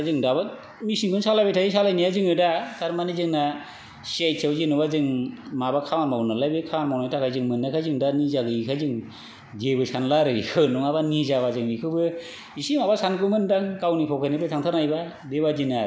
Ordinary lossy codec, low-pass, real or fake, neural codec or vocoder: none; none; real; none